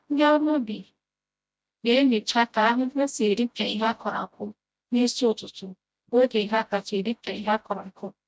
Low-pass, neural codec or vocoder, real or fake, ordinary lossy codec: none; codec, 16 kHz, 0.5 kbps, FreqCodec, smaller model; fake; none